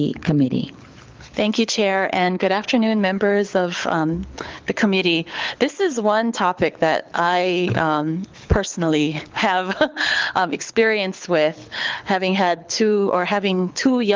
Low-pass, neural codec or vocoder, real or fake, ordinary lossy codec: 7.2 kHz; codec, 24 kHz, 6 kbps, HILCodec; fake; Opus, 24 kbps